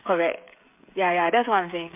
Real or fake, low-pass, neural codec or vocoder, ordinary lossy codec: fake; 3.6 kHz; codec, 16 kHz, 16 kbps, FreqCodec, smaller model; MP3, 32 kbps